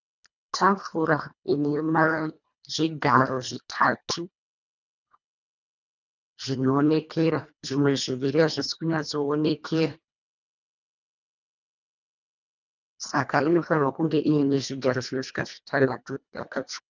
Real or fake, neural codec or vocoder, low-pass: fake; codec, 24 kHz, 1.5 kbps, HILCodec; 7.2 kHz